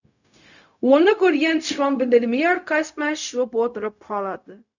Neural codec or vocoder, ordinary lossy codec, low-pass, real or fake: codec, 16 kHz, 0.4 kbps, LongCat-Audio-Codec; none; 7.2 kHz; fake